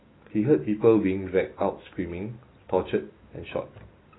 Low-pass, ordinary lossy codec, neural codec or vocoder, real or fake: 7.2 kHz; AAC, 16 kbps; none; real